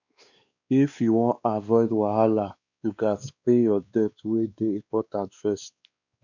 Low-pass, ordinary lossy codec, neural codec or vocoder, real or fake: 7.2 kHz; none; codec, 16 kHz, 2 kbps, X-Codec, WavLM features, trained on Multilingual LibriSpeech; fake